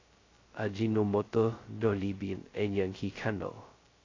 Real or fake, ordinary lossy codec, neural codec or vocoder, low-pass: fake; AAC, 32 kbps; codec, 16 kHz, 0.2 kbps, FocalCodec; 7.2 kHz